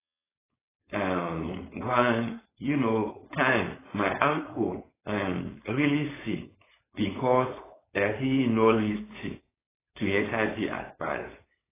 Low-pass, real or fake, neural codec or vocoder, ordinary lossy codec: 3.6 kHz; fake; codec, 16 kHz, 4.8 kbps, FACodec; AAC, 16 kbps